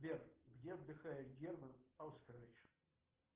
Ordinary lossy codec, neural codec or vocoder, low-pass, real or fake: Opus, 16 kbps; none; 3.6 kHz; real